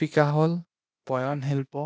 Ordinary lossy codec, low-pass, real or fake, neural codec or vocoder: none; none; fake; codec, 16 kHz, 0.8 kbps, ZipCodec